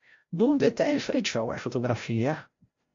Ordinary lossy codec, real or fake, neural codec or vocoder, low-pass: MP3, 48 kbps; fake; codec, 16 kHz, 0.5 kbps, FreqCodec, larger model; 7.2 kHz